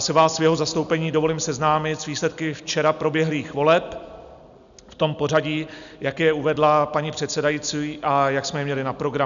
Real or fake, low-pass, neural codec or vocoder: real; 7.2 kHz; none